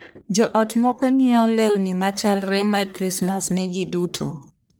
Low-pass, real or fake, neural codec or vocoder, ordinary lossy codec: none; fake; codec, 44.1 kHz, 1.7 kbps, Pupu-Codec; none